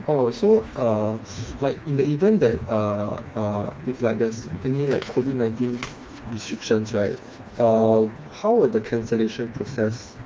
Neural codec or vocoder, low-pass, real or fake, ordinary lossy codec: codec, 16 kHz, 2 kbps, FreqCodec, smaller model; none; fake; none